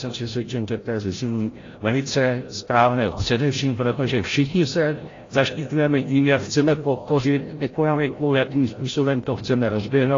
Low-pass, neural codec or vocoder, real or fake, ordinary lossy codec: 7.2 kHz; codec, 16 kHz, 0.5 kbps, FreqCodec, larger model; fake; AAC, 32 kbps